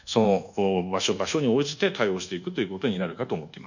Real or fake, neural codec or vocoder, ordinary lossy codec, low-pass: fake; codec, 24 kHz, 1.2 kbps, DualCodec; none; 7.2 kHz